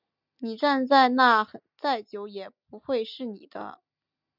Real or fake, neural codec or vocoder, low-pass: real; none; 5.4 kHz